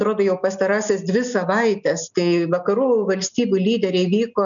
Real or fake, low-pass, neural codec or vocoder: real; 7.2 kHz; none